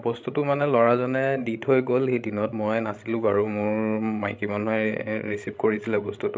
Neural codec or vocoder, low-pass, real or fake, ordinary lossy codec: codec, 16 kHz, 16 kbps, FreqCodec, larger model; none; fake; none